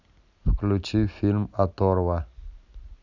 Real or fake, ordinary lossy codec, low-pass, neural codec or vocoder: real; none; 7.2 kHz; none